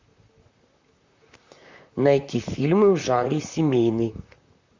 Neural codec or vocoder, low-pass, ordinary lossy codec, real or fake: vocoder, 44.1 kHz, 128 mel bands, Pupu-Vocoder; 7.2 kHz; MP3, 48 kbps; fake